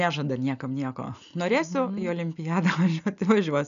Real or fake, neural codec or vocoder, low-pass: real; none; 7.2 kHz